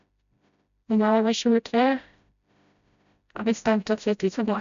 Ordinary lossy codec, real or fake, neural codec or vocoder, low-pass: AAC, 96 kbps; fake; codec, 16 kHz, 0.5 kbps, FreqCodec, smaller model; 7.2 kHz